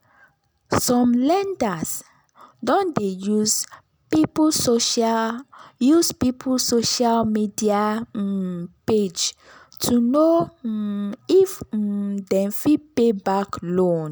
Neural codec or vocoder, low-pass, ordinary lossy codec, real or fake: none; none; none; real